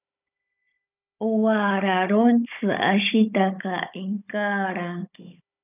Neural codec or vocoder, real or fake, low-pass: codec, 16 kHz, 16 kbps, FunCodec, trained on Chinese and English, 50 frames a second; fake; 3.6 kHz